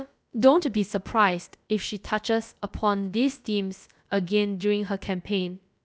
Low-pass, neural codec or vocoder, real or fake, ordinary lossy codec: none; codec, 16 kHz, about 1 kbps, DyCAST, with the encoder's durations; fake; none